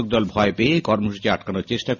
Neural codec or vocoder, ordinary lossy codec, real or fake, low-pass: none; none; real; 7.2 kHz